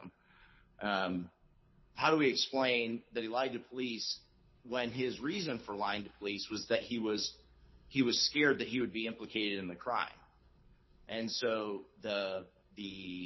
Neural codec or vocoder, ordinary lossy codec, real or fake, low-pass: codec, 24 kHz, 6 kbps, HILCodec; MP3, 24 kbps; fake; 7.2 kHz